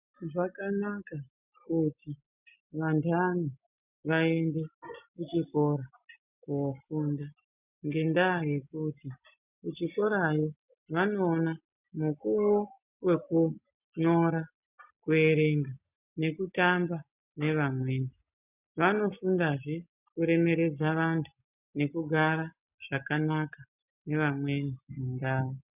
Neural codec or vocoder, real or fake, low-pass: none; real; 3.6 kHz